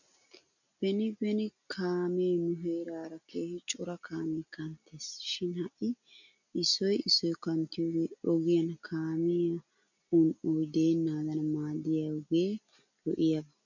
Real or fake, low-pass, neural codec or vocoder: real; 7.2 kHz; none